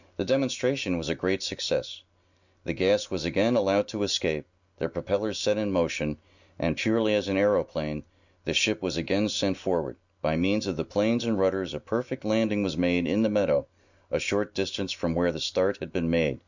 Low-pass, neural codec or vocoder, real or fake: 7.2 kHz; none; real